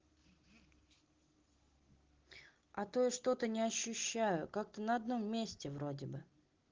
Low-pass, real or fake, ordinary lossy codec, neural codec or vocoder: 7.2 kHz; real; Opus, 16 kbps; none